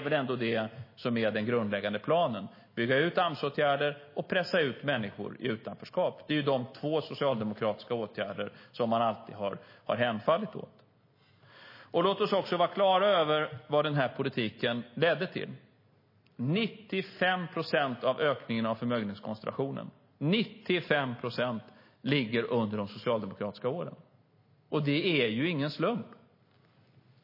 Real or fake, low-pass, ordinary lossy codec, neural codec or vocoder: real; 5.4 kHz; MP3, 24 kbps; none